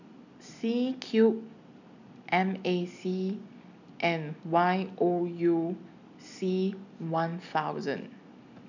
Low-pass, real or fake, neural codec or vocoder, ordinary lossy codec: 7.2 kHz; real; none; none